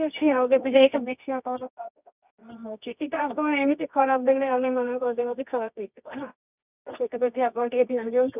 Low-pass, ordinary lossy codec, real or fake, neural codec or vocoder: 3.6 kHz; none; fake; codec, 24 kHz, 0.9 kbps, WavTokenizer, medium music audio release